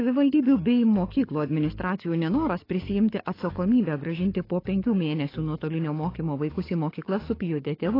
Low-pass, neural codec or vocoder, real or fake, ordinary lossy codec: 5.4 kHz; codec, 44.1 kHz, 7.8 kbps, DAC; fake; AAC, 24 kbps